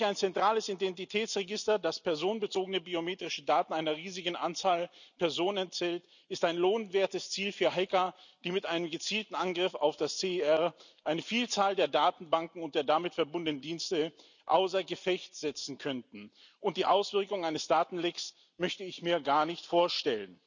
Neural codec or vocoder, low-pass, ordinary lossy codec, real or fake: none; 7.2 kHz; none; real